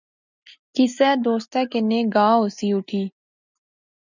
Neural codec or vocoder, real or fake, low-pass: none; real; 7.2 kHz